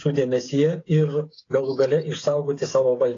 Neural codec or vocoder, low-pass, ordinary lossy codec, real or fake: codec, 16 kHz, 8 kbps, FreqCodec, smaller model; 7.2 kHz; AAC, 32 kbps; fake